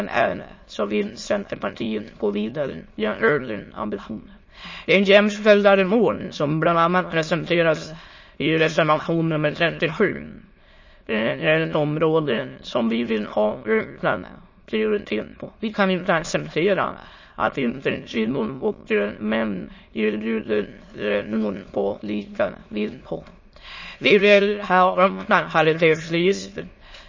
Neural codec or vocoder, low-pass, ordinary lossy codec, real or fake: autoencoder, 22.05 kHz, a latent of 192 numbers a frame, VITS, trained on many speakers; 7.2 kHz; MP3, 32 kbps; fake